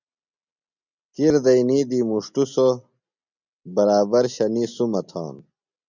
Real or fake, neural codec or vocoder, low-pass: real; none; 7.2 kHz